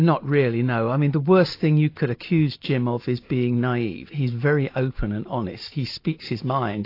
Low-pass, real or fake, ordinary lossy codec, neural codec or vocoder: 5.4 kHz; real; AAC, 32 kbps; none